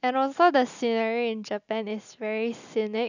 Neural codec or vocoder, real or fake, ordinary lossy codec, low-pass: none; real; none; 7.2 kHz